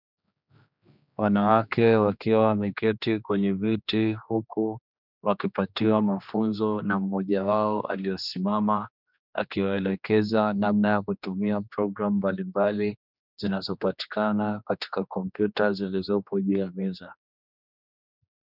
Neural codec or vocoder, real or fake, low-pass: codec, 16 kHz, 2 kbps, X-Codec, HuBERT features, trained on general audio; fake; 5.4 kHz